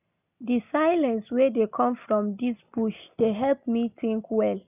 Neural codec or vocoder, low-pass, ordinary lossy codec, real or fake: none; 3.6 kHz; none; real